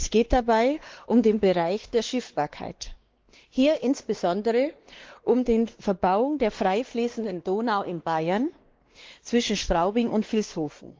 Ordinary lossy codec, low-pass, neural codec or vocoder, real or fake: Opus, 16 kbps; 7.2 kHz; codec, 16 kHz, 2 kbps, X-Codec, WavLM features, trained on Multilingual LibriSpeech; fake